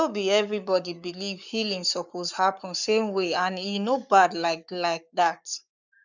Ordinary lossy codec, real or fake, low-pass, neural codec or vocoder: none; fake; 7.2 kHz; codec, 44.1 kHz, 7.8 kbps, Pupu-Codec